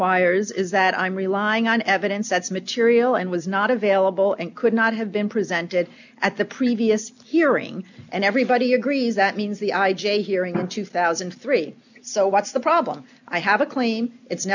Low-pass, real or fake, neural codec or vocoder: 7.2 kHz; real; none